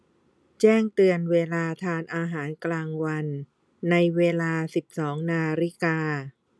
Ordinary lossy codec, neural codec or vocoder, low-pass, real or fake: none; none; none; real